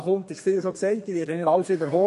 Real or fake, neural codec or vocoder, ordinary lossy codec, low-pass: fake; codec, 32 kHz, 1.9 kbps, SNAC; MP3, 48 kbps; 14.4 kHz